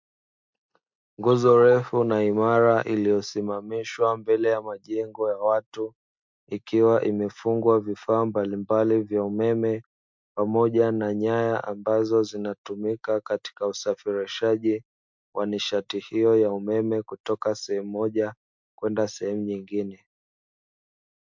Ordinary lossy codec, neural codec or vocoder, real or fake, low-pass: MP3, 64 kbps; none; real; 7.2 kHz